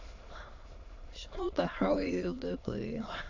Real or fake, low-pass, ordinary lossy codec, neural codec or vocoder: fake; 7.2 kHz; AAC, 48 kbps; autoencoder, 22.05 kHz, a latent of 192 numbers a frame, VITS, trained on many speakers